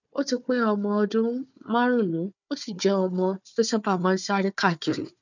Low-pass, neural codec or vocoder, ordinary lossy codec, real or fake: 7.2 kHz; codec, 16 kHz, 4 kbps, FunCodec, trained on Chinese and English, 50 frames a second; none; fake